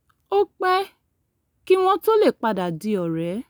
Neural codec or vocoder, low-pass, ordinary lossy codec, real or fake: none; none; none; real